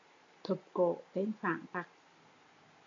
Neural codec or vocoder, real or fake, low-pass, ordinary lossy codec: none; real; 7.2 kHz; MP3, 64 kbps